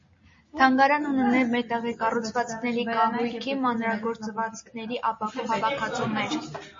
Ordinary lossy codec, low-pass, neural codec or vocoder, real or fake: MP3, 32 kbps; 7.2 kHz; none; real